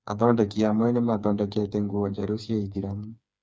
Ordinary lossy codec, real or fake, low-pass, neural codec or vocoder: none; fake; none; codec, 16 kHz, 4 kbps, FreqCodec, smaller model